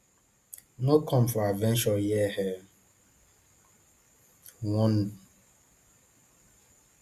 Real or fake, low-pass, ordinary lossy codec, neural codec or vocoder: real; 14.4 kHz; Opus, 64 kbps; none